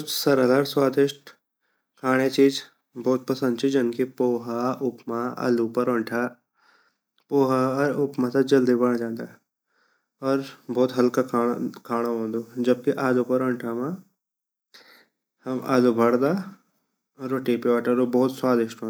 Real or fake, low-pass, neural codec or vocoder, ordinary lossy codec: real; none; none; none